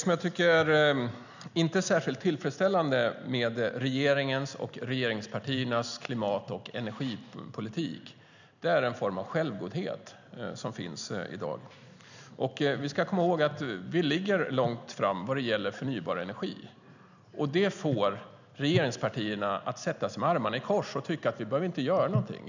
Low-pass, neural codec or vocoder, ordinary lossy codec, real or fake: 7.2 kHz; none; none; real